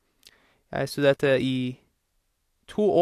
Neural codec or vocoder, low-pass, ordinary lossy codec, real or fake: none; 14.4 kHz; AAC, 64 kbps; real